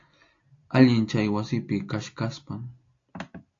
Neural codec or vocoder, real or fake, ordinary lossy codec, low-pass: none; real; AAC, 48 kbps; 7.2 kHz